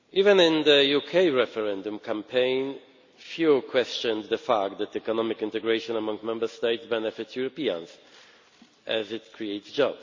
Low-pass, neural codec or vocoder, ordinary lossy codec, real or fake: 7.2 kHz; none; MP3, 48 kbps; real